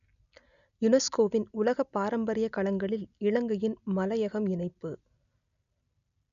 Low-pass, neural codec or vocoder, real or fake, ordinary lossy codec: 7.2 kHz; none; real; none